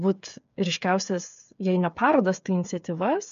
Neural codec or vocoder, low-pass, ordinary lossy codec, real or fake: codec, 16 kHz, 8 kbps, FreqCodec, smaller model; 7.2 kHz; MP3, 64 kbps; fake